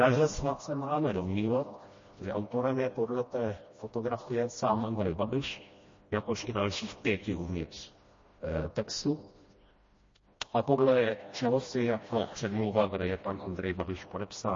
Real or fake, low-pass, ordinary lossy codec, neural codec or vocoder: fake; 7.2 kHz; MP3, 32 kbps; codec, 16 kHz, 1 kbps, FreqCodec, smaller model